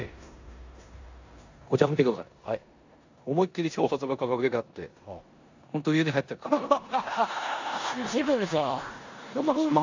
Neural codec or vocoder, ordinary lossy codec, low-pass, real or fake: codec, 16 kHz in and 24 kHz out, 0.9 kbps, LongCat-Audio-Codec, four codebook decoder; AAC, 48 kbps; 7.2 kHz; fake